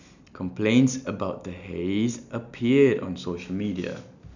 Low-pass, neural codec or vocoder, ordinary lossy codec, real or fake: 7.2 kHz; none; none; real